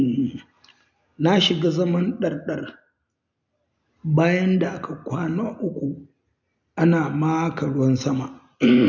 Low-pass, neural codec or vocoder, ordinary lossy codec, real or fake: 7.2 kHz; none; none; real